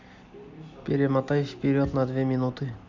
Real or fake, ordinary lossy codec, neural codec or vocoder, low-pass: real; MP3, 64 kbps; none; 7.2 kHz